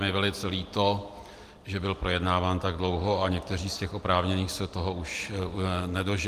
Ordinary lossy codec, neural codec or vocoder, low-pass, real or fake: Opus, 32 kbps; none; 14.4 kHz; real